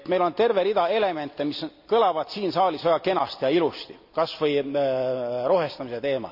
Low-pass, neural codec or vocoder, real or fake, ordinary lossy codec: 5.4 kHz; none; real; AAC, 48 kbps